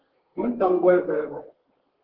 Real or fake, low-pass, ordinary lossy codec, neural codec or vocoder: fake; 5.4 kHz; Opus, 16 kbps; codec, 32 kHz, 1.9 kbps, SNAC